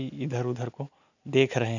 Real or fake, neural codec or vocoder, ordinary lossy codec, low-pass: real; none; none; 7.2 kHz